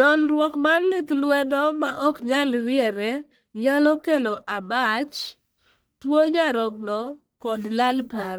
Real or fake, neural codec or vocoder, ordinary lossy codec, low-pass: fake; codec, 44.1 kHz, 1.7 kbps, Pupu-Codec; none; none